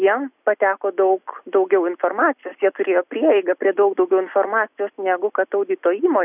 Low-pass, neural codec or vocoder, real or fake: 3.6 kHz; none; real